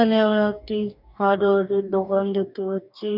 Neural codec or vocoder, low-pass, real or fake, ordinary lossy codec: codec, 44.1 kHz, 2.6 kbps, DAC; 5.4 kHz; fake; none